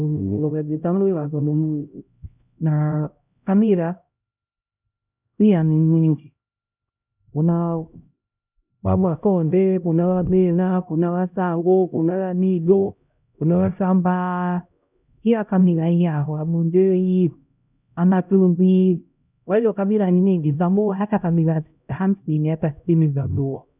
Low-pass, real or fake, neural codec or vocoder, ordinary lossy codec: 3.6 kHz; fake; codec, 16 kHz, 0.5 kbps, X-Codec, HuBERT features, trained on LibriSpeech; none